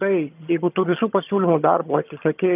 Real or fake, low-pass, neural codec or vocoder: fake; 3.6 kHz; vocoder, 22.05 kHz, 80 mel bands, HiFi-GAN